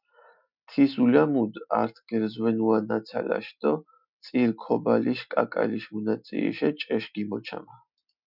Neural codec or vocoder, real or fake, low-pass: none; real; 5.4 kHz